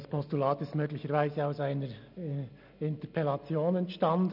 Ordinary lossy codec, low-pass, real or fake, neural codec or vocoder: none; 5.4 kHz; real; none